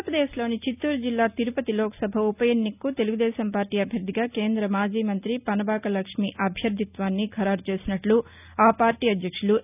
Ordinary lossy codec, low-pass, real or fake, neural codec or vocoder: none; 3.6 kHz; real; none